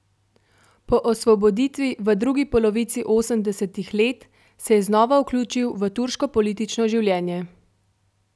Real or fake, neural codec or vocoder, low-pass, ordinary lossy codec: real; none; none; none